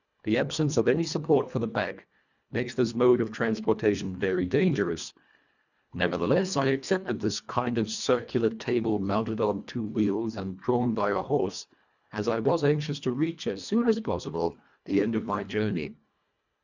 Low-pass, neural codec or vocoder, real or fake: 7.2 kHz; codec, 24 kHz, 1.5 kbps, HILCodec; fake